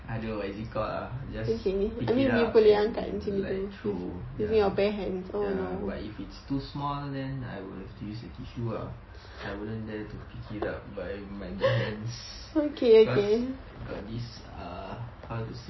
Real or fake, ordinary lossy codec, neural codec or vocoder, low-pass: real; MP3, 24 kbps; none; 7.2 kHz